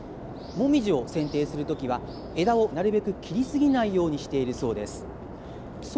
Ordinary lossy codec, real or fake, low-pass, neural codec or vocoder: none; real; none; none